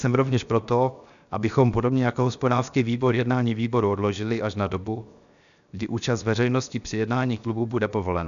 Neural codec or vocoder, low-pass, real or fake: codec, 16 kHz, 0.7 kbps, FocalCodec; 7.2 kHz; fake